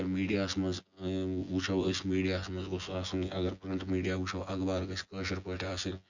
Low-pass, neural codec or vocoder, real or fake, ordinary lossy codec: 7.2 kHz; vocoder, 24 kHz, 100 mel bands, Vocos; fake; Opus, 64 kbps